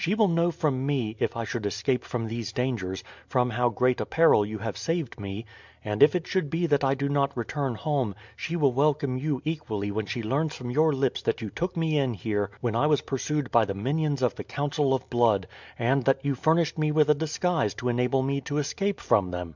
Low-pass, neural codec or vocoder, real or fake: 7.2 kHz; none; real